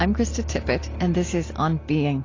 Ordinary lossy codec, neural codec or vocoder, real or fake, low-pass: AAC, 32 kbps; none; real; 7.2 kHz